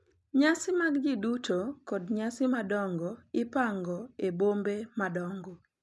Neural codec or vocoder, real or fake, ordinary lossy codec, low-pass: none; real; none; none